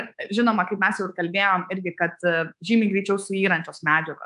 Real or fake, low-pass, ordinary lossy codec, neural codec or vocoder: fake; 10.8 kHz; AAC, 96 kbps; codec, 24 kHz, 3.1 kbps, DualCodec